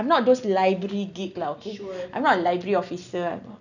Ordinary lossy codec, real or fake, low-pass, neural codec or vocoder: none; real; 7.2 kHz; none